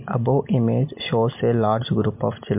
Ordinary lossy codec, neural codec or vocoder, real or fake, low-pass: MP3, 32 kbps; none; real; 3.6 kHz